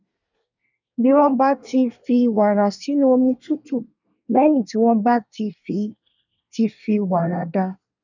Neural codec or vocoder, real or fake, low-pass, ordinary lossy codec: codec, 24 kHz, 1 kbps, SNAC; fake; 7.2 kHz; none